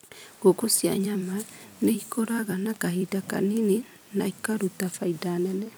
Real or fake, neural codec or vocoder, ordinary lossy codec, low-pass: fake; vocoder, 44.1 kHz, 128 mel bands every 256 samples, BigVGAN v2; none; none